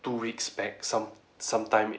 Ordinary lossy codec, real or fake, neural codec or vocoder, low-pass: none; real; none; none